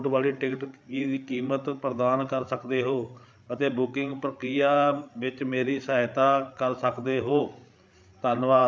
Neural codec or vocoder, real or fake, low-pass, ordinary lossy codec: codec, 16 kHz, 8 kbps, FreqCodec, larger model; fake; none; none